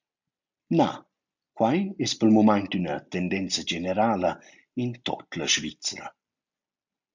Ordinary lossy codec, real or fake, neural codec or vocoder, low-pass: MP3, 64 kbps; real; none; 7.2 kHz